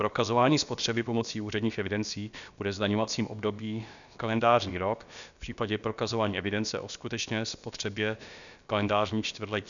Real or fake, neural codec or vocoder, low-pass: fake; codec, 16 kHz, about 1 kbps, DyCAST, with the encoder's durations; 7.2 kHz